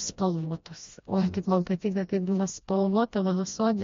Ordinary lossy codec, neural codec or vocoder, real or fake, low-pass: MP3, 48 kbps; codec, 16 kHz, 1 kbps, FreqCodec, smaller model; fake; 7.2 kHz